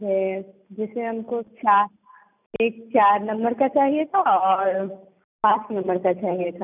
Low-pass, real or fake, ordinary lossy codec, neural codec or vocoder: 3.6 kHz; real; none; none